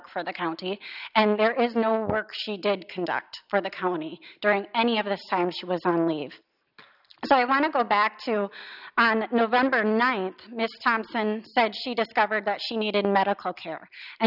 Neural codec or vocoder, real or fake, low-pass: none; real; 5.4 kHz